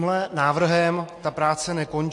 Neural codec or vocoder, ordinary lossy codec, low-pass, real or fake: none; MP3, 48 kbps; 10.8 kHz; real